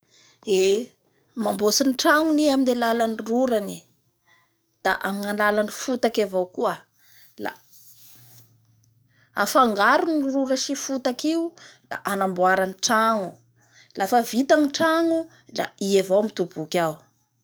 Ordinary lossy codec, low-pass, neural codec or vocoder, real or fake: none; none; codec, 44.1 kHz, 7.8 kbps, DAC; fake